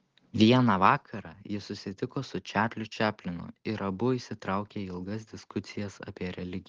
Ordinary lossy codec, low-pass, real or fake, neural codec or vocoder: Opus, 16 kbps; 7.2 kHz; real; none